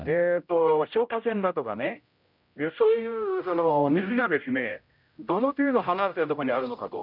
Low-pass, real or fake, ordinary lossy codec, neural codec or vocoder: 5.4 kHz; fake; none; codec, 16 kHz, 0.5 kbps, X-Codec, HuBERT features, trained on general audio